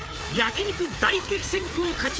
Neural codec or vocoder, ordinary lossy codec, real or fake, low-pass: codec, 16 kHz, 4 kbps, FreqCodec, larger model; none; fake; none